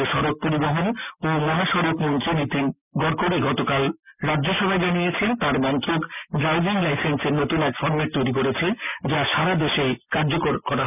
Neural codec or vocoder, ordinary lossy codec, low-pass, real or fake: none; none; 3.6 kHz; real